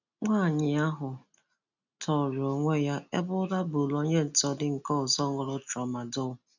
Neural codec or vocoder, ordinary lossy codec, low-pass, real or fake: none; none; 7.2 kHz; real